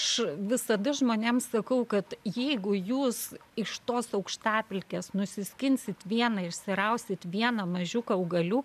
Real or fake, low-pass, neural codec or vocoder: real; 14.4 kHz; none